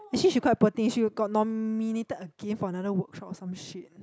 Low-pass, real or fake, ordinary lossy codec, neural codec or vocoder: none; real; none; none